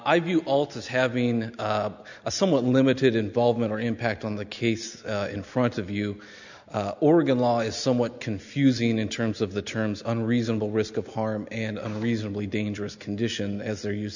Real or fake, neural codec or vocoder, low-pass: real; none; 7.2 kHz